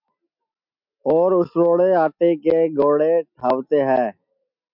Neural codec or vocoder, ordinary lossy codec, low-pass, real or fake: none; MP3, 48 kbps; 5.4 kHz; real